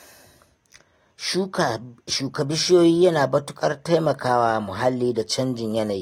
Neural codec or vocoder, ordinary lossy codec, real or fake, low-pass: none; AAC, 48 kbps; real; 19.8 kHz